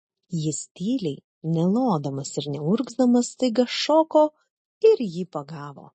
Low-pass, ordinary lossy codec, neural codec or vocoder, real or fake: 10.8 kHz; MP3, 32 kbps; none; real